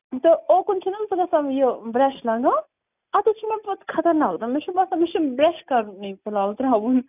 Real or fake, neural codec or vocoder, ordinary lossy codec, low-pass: real; none; none; 3.6 kHz